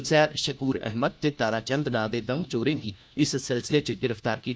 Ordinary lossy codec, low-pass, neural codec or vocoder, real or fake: none; none; codec, 16 kHz, 1 kbps, FunCodec, trained on LibriTTS, 50 frames a second; fake